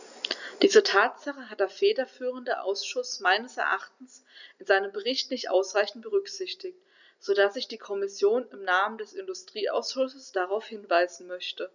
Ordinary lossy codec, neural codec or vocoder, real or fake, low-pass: none; none; real; 7.2 kHz